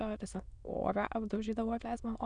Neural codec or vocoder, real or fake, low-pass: autoencoder, 22.05 kHz, a latent of 192 numbers a frame, VITS, trained on many speakers; fake; 9.9 kHz